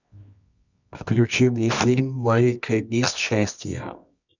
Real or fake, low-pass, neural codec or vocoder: fake; 7.2 kHz; codec, 24 kHz, 0.9 kbps, WavTokenizer, medium music audio release